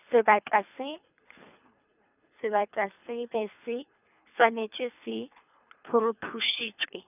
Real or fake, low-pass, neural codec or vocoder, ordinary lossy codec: fake; 3.6 kHz; codec, 24 kHz, 3 kbps, HILCodec; none